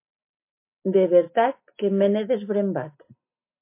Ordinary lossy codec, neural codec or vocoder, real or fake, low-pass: MP3, 24 kbps; none; real; 3.6 kHz